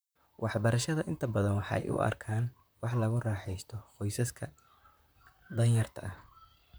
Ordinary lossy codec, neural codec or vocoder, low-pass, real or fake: none; vocoder, 44.1 kHz, 128 mel bands, Pupu-Vocoder; none; fake